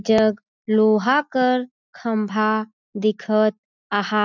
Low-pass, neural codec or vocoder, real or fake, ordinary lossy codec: 7.2 kHz; none; real; none